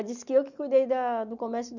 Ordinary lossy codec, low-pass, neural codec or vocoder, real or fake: none; 7.2 kHz; none; real